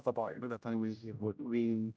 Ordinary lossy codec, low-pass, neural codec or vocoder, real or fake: none; none; codec, 16 kHz, 0.5 kbps, X-Codec, HuBERT features, trained on general audio; fake